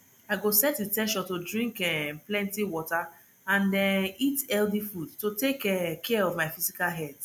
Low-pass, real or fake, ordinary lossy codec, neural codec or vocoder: none; real; none; none